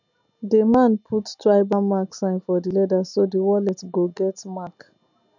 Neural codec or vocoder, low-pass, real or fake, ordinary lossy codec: none; 7.2 kHz; real; none